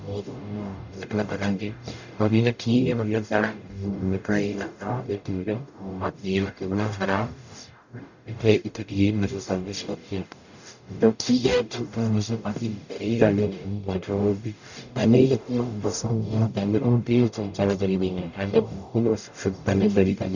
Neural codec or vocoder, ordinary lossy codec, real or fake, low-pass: codec, 44.1 kHz, 0.9 kbps, DAC; none; fake; 7.2 kHz